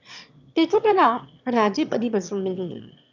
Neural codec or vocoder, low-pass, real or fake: autoencoder, 22.05 kHz, a latent of 192 numbers a frame, VITS, trained on one speaker; 7.2 kHz; fake